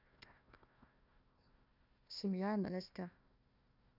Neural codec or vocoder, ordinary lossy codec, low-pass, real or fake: codec, 16 kHz, 1 kbps, FunCodec, trained on Chinese and English, 50 frames a second; none; 5.4 kHz; fake